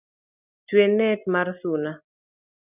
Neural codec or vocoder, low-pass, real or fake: none; 3.6 kHz; real